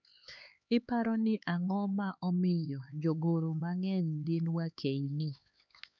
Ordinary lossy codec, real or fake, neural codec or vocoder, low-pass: none; fake; codec, 16 kHz, 4 kbps, X-Codec, HuBERT features, trained on LibriSpeech; 7.2 kHz